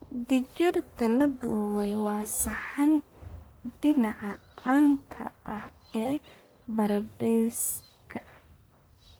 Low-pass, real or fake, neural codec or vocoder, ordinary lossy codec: none; fake; codec, 44.1 kHz, 1.7 kbps, Pupu-Codec; none